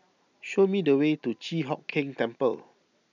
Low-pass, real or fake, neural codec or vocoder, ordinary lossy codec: 7.2 kHz; real; none; none